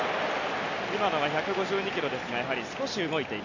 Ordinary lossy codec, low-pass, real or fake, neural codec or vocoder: none; 7.2 kHz; real; none